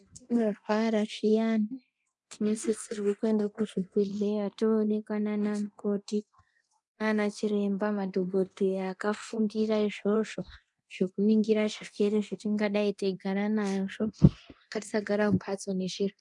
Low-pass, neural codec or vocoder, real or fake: 10.8 kHz; codec, 24 kHz, 0.9 kbps, DualCodec; fake